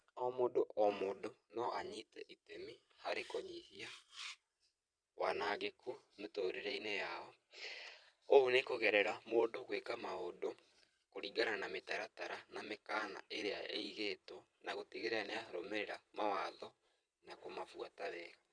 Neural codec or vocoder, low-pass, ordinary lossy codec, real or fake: vocoder, 22.05 kHz, 80 mel bands, Vocos; 9.9 kHz; none; fake